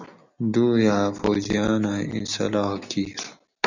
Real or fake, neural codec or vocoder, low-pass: real; none; 7.2 kHz